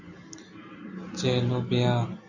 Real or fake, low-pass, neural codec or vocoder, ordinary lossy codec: real; 7.2 kHz; none; AAC, 48 kbps